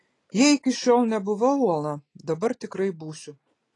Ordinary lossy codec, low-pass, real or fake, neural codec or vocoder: AAC, 32 kbps; 10.8 kHz; real; none